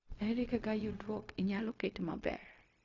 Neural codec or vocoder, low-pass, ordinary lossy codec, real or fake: codec, 16 kHz, 0.4 kbps, LongCat-Audio-Codec; 7.2 kHz; none; fake